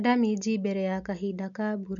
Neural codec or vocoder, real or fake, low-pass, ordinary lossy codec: none; real; 7.2 kHz; none